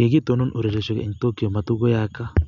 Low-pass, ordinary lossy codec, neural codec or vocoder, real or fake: 7.2 kHz; none; none; real